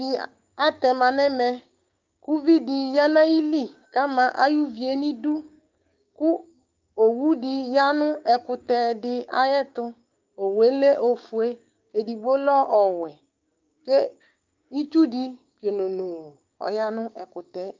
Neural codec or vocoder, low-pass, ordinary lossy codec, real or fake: codec, 16 kHz, 6 kbps, DAC; 7.2 kHz; Opus, 24 kbps; fake